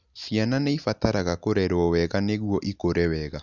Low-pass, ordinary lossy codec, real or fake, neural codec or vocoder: 7.2 kHz; none; real; none